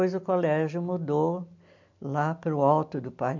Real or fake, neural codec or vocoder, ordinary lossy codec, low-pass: real; none; MP3, 48 kbps; 7.2 kHz